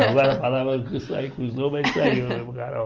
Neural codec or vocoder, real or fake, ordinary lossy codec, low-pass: none; real; Opus, 24 kbps; 7.2 kHz